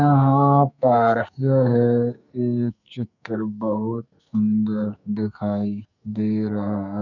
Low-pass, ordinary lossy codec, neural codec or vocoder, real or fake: 7.2 kHz; none; codec, 44.1 kHz, 2.6 kbps, SNAC; fake